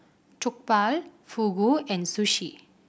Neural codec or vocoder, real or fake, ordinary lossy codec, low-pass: none; real; none; none